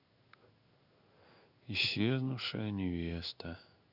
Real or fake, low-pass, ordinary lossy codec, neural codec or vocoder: fake; 5.4 kHz; none; codec, 16 kHz in and 24 kHz out, 1 kbps, XY-Tokenizer